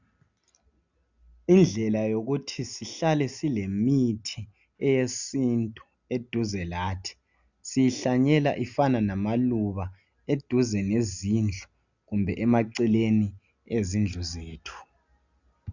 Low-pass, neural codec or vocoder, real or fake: 7.2 kHz; none; real